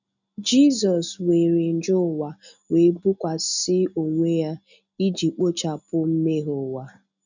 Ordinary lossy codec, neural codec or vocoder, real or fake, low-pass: none; none; real; 7.2 kHz